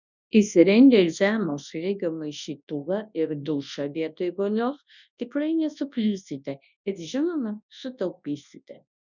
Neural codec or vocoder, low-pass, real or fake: codec, 24 kHz, 0.9 kbps, WavTokenizer, large speech release; 7.2 kHz; fake